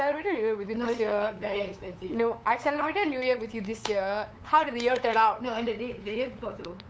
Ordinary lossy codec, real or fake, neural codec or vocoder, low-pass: none; fake; codec, 16 kHz, 8 kbps, FunCodec, trained on LibriTTS, 25 frames a second; none